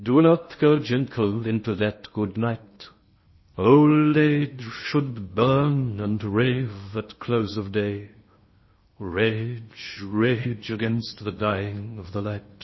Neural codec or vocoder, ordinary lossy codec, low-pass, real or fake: codec, 16 kHz in and 24 kHz out, 0.6 kbps, FocalCodec, streaming, 2048 codes; MP3, 24 kbps; 7.2 kHz; fake